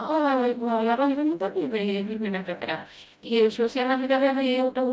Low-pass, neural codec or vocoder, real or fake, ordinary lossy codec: none; codec, 16 kHz, 0.5 kbps, FreqCodec, smaller model; fake; none